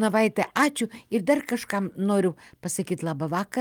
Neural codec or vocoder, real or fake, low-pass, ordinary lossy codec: none; real; 19.8 kHz; Opus, 32 kbps